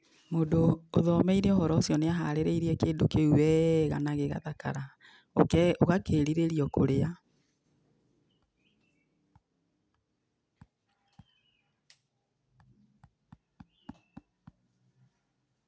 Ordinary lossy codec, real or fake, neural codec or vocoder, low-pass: none; real; none; none